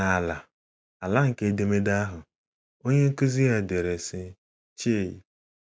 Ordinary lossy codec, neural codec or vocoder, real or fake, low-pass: none; none; real; none